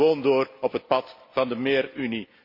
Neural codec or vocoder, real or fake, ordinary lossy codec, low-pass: none; real; MP3, 32 kbps; 5.4 kHz